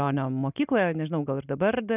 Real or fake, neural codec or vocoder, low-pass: real; none; 3.6 kHz